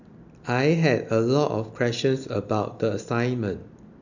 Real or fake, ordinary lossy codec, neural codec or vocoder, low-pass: real; none; none; 7.2 kHz